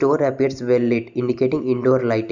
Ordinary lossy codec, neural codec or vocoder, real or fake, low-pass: none; none; real; 7.2 kHz